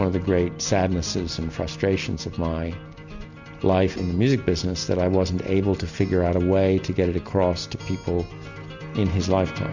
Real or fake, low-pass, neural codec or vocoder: real; 7.2 kHz; none